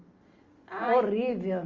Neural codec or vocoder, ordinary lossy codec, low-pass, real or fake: none; Opus, 32 kbps; 7.2 kHz; real